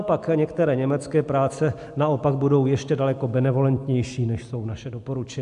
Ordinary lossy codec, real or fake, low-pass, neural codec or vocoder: AAC, 64 kbps; real; 10.8 kHz; none